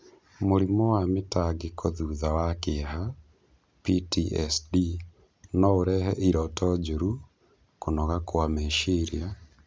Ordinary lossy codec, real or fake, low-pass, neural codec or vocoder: none; real; none; none